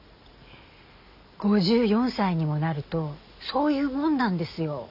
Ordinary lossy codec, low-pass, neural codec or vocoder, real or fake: none; 5.4 kHz; none; real